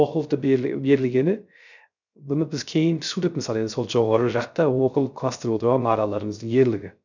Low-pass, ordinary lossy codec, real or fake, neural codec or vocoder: 7.2 kHz; none; fake; codec, 16 kHz, 0.3 kbps, FocalCodec